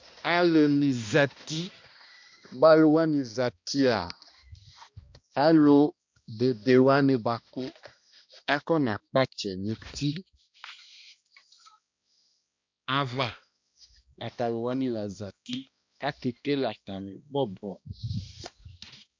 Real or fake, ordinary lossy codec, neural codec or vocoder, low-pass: fake; MP3, 64 kbps; codec, 16 kHz, 1 kbps, X-Codec, HuBERT features, trained on balanced general audio; 7.2 kHz